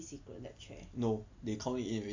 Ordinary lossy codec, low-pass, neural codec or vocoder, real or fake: AAC, 48 kbps; 7.2 kHz; none; real